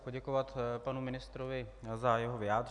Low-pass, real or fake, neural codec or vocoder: 10.8 kHz; real; none